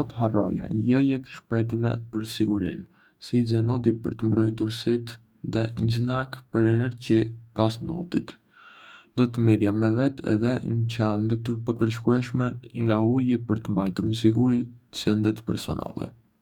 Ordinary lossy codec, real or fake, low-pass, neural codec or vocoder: none; fake; 19.8 kHz; codec, 44.1 kHz, 2.6 kbps, DAC